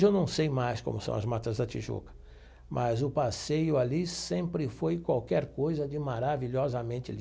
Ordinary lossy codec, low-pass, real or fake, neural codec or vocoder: none; none; real; none